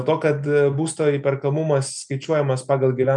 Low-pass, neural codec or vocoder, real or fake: 10.8 kHz; none; real